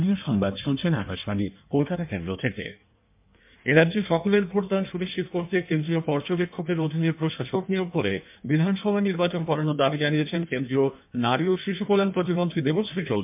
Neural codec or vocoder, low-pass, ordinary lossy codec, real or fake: codec, 16 kHz in and 24 kHz out, 1.1 kbps, FireRedTTS-2 codec; 3.6 kHz; MP3, 32 kbps; fake